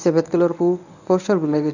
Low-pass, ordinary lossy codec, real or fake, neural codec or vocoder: 7.2 kHz; none; fake; codec, 24 kHz, 0.9 kbps, WavTokenizer, medium speech release version 1